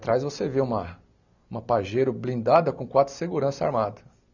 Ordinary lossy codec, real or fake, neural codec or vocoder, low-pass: none; real; none; 7.2 kHz